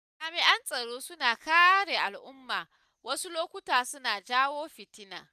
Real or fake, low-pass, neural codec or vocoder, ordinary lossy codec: real; 14.4 kHz; none; none